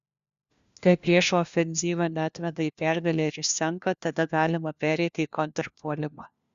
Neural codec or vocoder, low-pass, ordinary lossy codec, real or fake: codec, 16 kHz, 1 kbps, FunCodec, trained on LibriTTS, 50 frames a second; 7.2 kHz; Opus, 64 kbps; fake